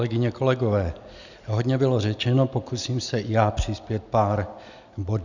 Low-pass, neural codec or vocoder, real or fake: 7.2 kHz; none; real